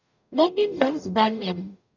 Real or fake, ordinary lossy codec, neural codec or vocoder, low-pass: fake; none; codec, 44.1 kHz, 0.9 kbps, DAC; 7.2 kHz